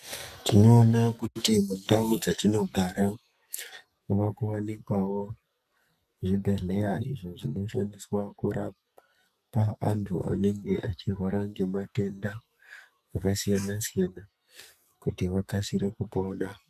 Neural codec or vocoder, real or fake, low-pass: codec, 44.1 kHz, 2.6 kbps, SNAC; fake; 14.4 kHz